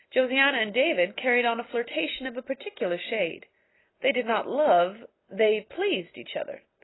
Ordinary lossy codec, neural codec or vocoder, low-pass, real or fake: AAC, 16 kbps; none; 7.2 kHz; real